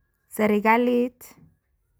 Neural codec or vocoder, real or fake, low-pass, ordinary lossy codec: none; real; none; none